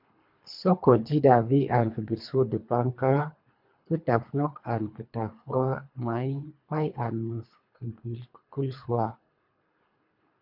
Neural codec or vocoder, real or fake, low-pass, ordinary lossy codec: codec, 24 kHz, 3 kbps, HILCodec; fake; 5.4 kHz; MP3, 48 kbps